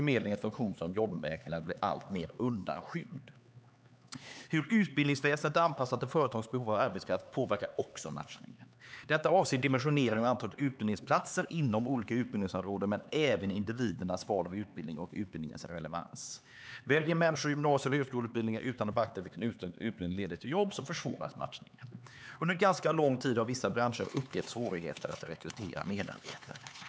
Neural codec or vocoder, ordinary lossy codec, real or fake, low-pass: codec, 16 kHz, 4 kbps, X-Codec, HuBERT features, trained on LibriSpeech; none; fake; none